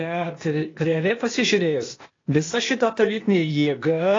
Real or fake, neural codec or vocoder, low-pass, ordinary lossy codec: fake; codec, 16 kHz, 0.8 kbps, ZipCodec; 7.2 kHz; AAC, 32 kbps